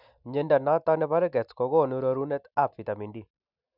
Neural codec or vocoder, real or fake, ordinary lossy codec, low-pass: none; real; none; 5.4 kHz